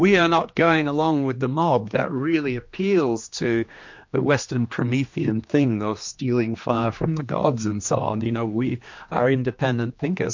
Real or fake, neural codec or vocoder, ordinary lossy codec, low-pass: fake; codec, 16 kHz, 2 kbps, X-Codec, HuBERT features, trained on general audio; MP3, 48 kbps; 7.2 kHz